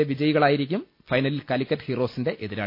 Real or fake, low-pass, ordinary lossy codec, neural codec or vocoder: real; 5.4 kHz; MP3, 32 kbps; none